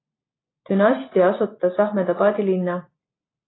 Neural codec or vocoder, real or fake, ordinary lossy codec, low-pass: none; real; AAC, 16 kbps; 7.2 kHz